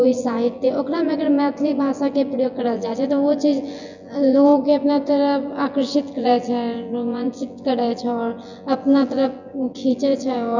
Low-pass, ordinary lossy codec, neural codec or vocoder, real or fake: 7.2 kHz; none; vocoder, 24 kHz, 100 mel bands, Vocos; fake